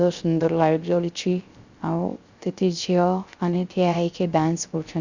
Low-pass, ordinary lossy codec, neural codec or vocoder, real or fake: 7.2 kHz; Opus, 64 kbps; codec, 16 kHz, 0.3 kbps, FocalCodec; fake